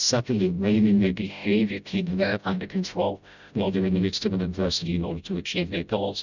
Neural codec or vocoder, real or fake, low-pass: codec, 16 kHz, 0.5 kbps, FreqCodec, smaller model; fake; 7.2 kHz